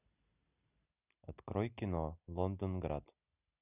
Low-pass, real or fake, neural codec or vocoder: 3.6 kHz; real; none